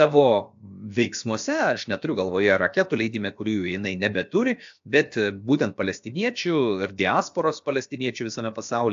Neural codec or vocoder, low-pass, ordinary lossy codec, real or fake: codec, 16 kHz, about 1 kbps, DyCAST, with the encoder's durations; 7.2 kHz; AAC, 64 kbps; fake